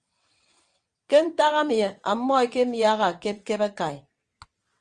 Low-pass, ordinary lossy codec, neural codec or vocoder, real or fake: 9.9 kHz; Opus, 32 kbps; vocoder, 22.05 kHz, 80 mel bands, WaveNeXt; fake